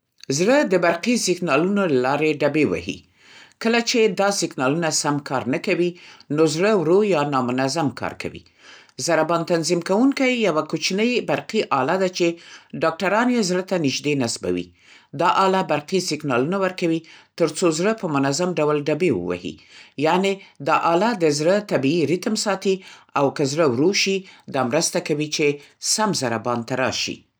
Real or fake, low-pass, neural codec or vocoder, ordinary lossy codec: real; none; none; none